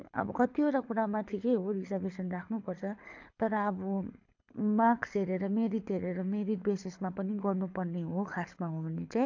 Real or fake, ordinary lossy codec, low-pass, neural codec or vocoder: fake; AAC, 48 kbps; 7.2 kHz; codec, 24 kHz, 6 kbps, HILCodec